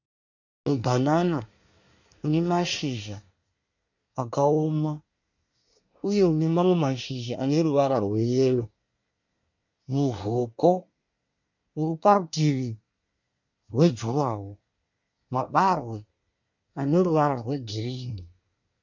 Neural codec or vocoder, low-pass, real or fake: codec, 24 kHz, 1 kbps, SNAC; 7.2 kHz; fake